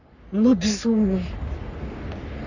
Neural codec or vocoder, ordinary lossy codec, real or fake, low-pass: codec, 44.1 kHz, 3.4 kbps, Pupu-Codec; none; fake; 7.2 kHz